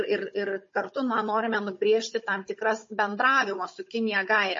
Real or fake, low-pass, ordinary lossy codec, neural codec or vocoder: fake; 7.2 kHz; MP3, 32 kbps; codec, 16 kHz, 16 kbps, FunCodec, trained on Chinese and English, 50 frames a second